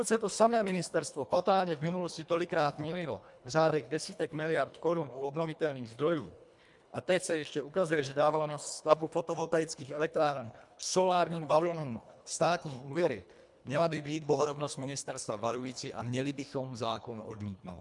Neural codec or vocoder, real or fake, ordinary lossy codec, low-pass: codec, 24 kHz, 1.5 kbps, HILCodec; fake; MP3, 96 kbps; 10.8 kHz